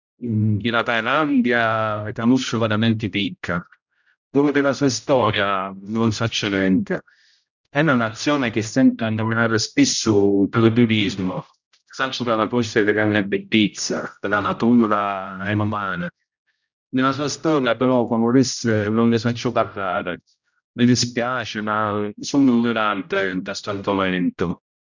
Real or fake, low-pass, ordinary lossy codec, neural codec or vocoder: fake; 7.2 kHz; none; codec, 16 kHz, 0.5 kbps, X-Codec, HuBERT features, trained on general audio